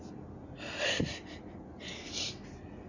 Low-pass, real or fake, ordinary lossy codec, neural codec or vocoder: 7.2 kHz; real; none; none